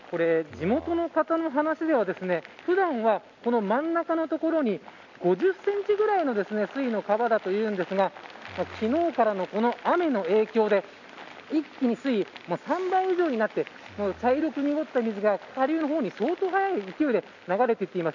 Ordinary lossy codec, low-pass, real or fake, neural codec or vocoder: none; 7.2 kHz; real; none